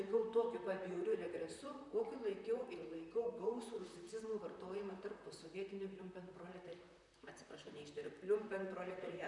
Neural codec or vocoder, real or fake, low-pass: vocoder, 44.1 kHz, 128 mel bands, Pupu-Vocoder; fake; 10.8 kHz